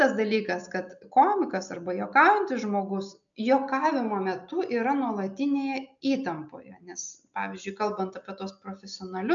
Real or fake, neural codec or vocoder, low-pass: real; none; 7.2 kHz